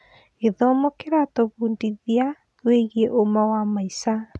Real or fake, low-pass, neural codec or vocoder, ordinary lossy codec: real; none; none; none